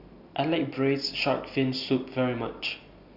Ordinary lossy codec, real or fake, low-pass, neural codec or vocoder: Opus, 64 kbps; real; 5.4 kHz; none